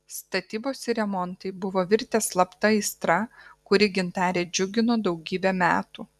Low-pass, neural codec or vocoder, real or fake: 14.4 kHz; vocoder, 44.1 kHz, 128 mel bands every 256 samples, BigVGAN v2; fake